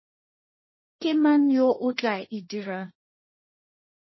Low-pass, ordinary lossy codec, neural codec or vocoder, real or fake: 7.2 kHz; MP3, 24 kbps; codec, 16 kHz, 1.1 kbps, Voila-Tokenizer; fake